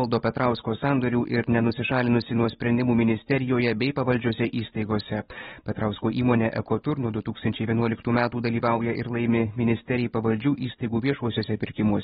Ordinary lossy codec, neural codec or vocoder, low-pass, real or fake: AAC, 16 kbps; none; 10.8 kHz; real